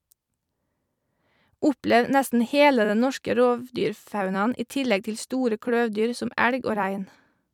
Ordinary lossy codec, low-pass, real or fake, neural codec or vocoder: none; 19.8 kHz; fake; vocoder, 44.1 kHz, 128 mel bands every 256 samples, BigVGAN v2